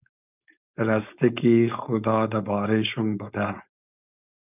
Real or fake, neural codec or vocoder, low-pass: fake; codec, 16 kHz, 4.8 kbps, FACodec; 3.6 kHz